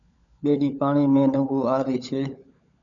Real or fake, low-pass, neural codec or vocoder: fake; 7.2 kHz; codec, 16 kHz, 16 kbps, FunCodec, trained on LibriTTS, 50 frames a second